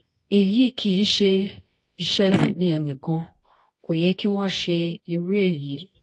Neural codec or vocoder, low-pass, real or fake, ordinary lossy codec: codec, 24 kHz, 0.9 kbps, WavTokenizer, medium music audio release; 10.8 kHz; fake; AAC, 48 kbps